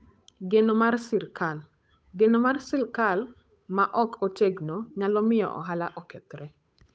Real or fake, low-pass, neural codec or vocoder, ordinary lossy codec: fake; none; codec, 16 kHz, 8 kbps, FunCodec, trained on Chinese and English, 25 frames a second; none